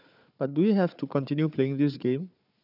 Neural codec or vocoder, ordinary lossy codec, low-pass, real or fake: codec, 16 kHz, 4 kbps, FunCodec, trained on Chinese and English, 50 frames a second; none; 5.4 kHz; fake